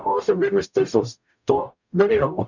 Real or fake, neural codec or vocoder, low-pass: fake; codec, 44.1 kHz, 0.9 kbps, DAC; 7.2 kHz